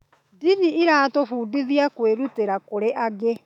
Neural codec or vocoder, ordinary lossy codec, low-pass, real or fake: autoencoder, 48 kHz, 128 numbers a frame, DAC-VAE, trained on Japanese speech; none; 19.8 kHz; fake